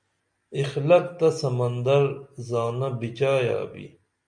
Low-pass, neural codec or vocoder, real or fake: 9.9 kHz; none; real